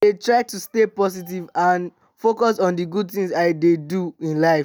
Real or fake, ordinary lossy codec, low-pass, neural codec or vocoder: real; none; none; none